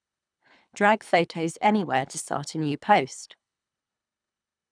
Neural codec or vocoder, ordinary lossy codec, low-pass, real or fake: codec, 24 kHz, 3 kbps, HILCodec; none; 9.9 kHz; fake